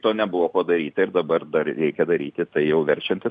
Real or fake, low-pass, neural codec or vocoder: real; 9.9 kHz; none